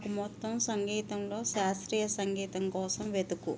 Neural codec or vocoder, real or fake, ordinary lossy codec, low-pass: none; real; none; none